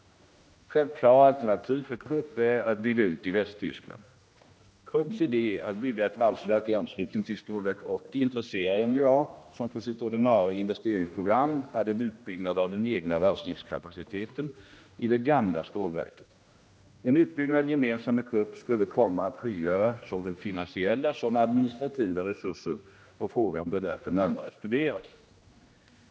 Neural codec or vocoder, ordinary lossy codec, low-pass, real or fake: codec, 16 kHz, 1 kbps, X-Codec, HuBERT features, trained on general audio; none; none; fake